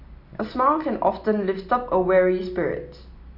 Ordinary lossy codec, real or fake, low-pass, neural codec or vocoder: none; real; 5.4 kHz; none